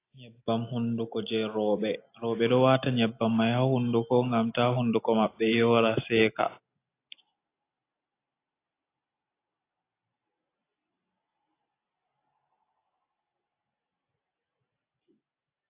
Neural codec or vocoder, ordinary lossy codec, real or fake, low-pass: none; AAC, 24 kbps; real; 3.6 kHz